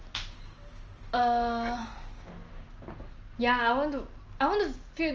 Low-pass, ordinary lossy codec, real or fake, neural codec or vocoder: 7.2 kHz; Opus, 24 kbps; real; none